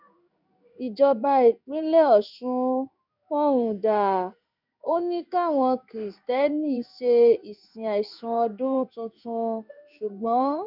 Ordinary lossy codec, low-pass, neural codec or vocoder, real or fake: none; 5.4 kHz; codec, 16 kHz in and 24 kHz out, 1 kbps, XY-Tokenizer; fake